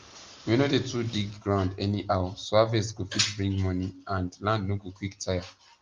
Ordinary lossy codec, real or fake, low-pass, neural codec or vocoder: Opus, 32 kbps; real; 7.2 kHz; none